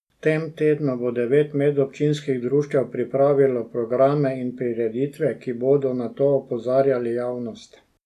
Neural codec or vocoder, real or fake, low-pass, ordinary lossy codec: none; real; 14.4 kHz; none